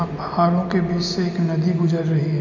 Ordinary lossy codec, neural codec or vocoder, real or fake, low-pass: none; none; real; 7.2 kHz